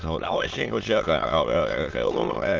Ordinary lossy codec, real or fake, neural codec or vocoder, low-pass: Opus, 32 kbps; fake; autoencoder, 22.05 kHz, a latent of 192 numbers a frame, VITS, trained on many speakers; 7.2 kHz